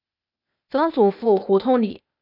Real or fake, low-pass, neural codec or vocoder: fake; 5.4 kHz; codec, 16 kHz, 0.8 kbps, ZipCodec